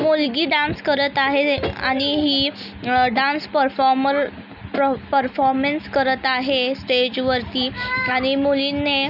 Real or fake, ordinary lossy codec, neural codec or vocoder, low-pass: real; none; none; 5.4 kHz